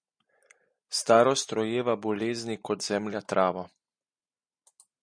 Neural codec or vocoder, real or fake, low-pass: none; real; 9.9 kHz